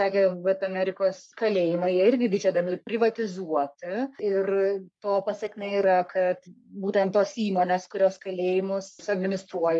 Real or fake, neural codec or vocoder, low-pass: fake; codec, 44.1 kHz, 3.4 kbps, Pupu-Codec; 10.8 kHz